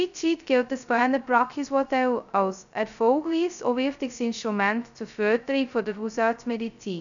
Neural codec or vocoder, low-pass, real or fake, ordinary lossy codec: codec, 16 kHz, 0.2 kbps, FocalCodec; 7.2 kHz; fake; none